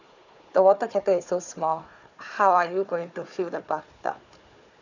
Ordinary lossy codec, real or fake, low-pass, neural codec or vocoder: none; fake; 7.2 kHz; codec, 16 kHz, 4 kbps, FunCodec, trained on Chinese and English, 50 frames a second